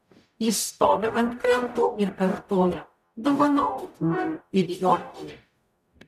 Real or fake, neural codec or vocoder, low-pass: fake; codec, 44.1 kHz, 0.9 kbps, DAC; 14.4 kHz